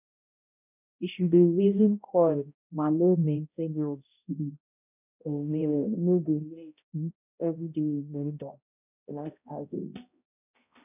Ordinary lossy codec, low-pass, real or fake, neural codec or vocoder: none; 3.6 kHz; fake; codec, 16 kHz, 0.5 kbps, X-Codec, HuBERT features, trained on balanced general audio